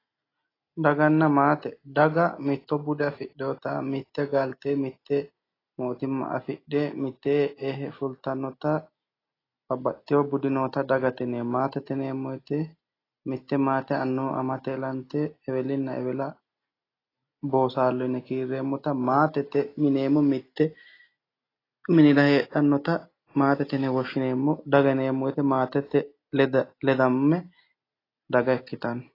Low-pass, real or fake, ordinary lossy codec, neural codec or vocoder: 5.4 kHz; real; AAC, 24 kbps; none